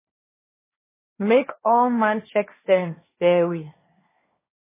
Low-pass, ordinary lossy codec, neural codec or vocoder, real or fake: 3.6 kHz; MP3, 16 kbps; codec, 16 kHz, 1.1 kbps, Voila-Tokenizer; fake